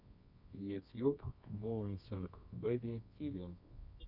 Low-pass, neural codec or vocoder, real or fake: 5.4 kHz; codec, 24 kHz, 0.9 kbps, WavTokenizer, medium music audio release; fake